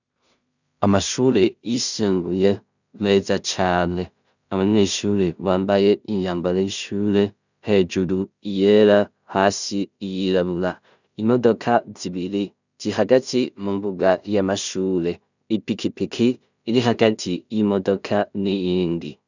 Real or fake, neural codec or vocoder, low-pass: fake; codec, 16 kHz in and 24 kHz out, 0.4 kbps, LongCat-Audio-Codec, two codebook decoder; 7.2 kHz